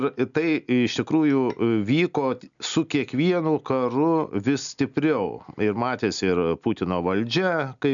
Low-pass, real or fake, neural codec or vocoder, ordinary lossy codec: 7.2 kHz; real; none; MP3, 96 kbps